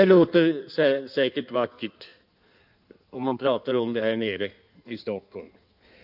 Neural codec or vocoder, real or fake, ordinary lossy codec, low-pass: codec, 16 kHz in and 24 kHz out, 1.1 kbps, FireRedTTS-2 codec; fake; none; 5.4 kHz